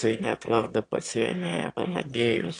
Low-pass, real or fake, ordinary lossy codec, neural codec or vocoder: 9.9 kHz; fake; AAC, 48 kbps; autoencoder, 22.05 kHz, a latent of 192 numbers a frame, VITS, trained on one speaker